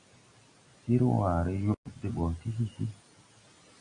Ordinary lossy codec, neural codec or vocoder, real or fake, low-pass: Opus, 64 kbps; none; real; 9.9 kHz